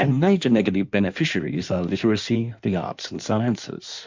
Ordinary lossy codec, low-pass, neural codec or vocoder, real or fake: MP3, 64 kbps; 7.2 kHz; codec, 16 kHz in and 24 kHz out, 1.1 kbps, FireRedTTS-2 codec; fake